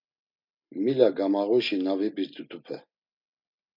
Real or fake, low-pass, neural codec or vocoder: real; 5.4 kHz; none